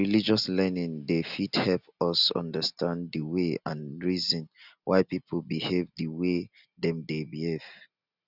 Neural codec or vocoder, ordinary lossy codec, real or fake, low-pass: none; none; real; 5.4 kHz